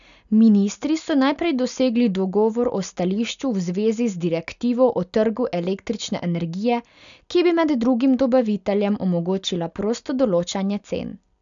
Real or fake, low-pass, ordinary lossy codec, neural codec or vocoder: real; 7.2 kHz; none; none